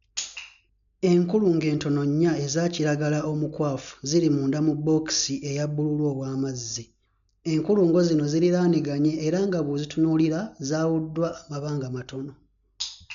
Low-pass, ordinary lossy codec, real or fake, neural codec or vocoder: 7.2 kHz; none; real; none